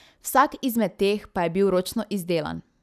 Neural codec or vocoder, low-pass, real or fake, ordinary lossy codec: none; 14.4 kHz; real; none